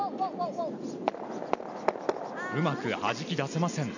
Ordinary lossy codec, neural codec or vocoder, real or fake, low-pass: none; none; real; 7.2 kHz